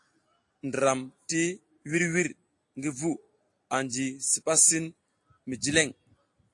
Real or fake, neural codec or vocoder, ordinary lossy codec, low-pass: real; none; AAC, 48 kbps; 10.8 kHz